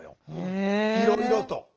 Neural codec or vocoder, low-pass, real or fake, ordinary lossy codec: none; 7.2 kHz; real; Opus, 16 kbps